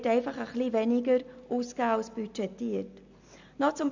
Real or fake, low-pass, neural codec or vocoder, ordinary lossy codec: real; 7.2 kHz; none; MP3, 64 kbps